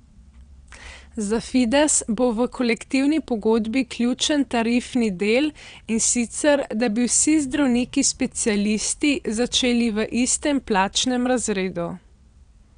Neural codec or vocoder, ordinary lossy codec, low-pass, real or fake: vocoder, 22.05 kHz, 80 mel bands, WaveNeXt; none; 9.9 kHz; fake